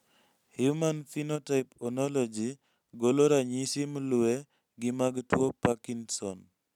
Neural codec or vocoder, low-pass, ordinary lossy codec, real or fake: none; 19.8 kHz; none; real